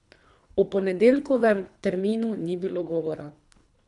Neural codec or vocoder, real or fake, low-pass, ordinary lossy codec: codec, 24 kHz, 3 kbps, HILCodec; fake; 10.8 kHz; none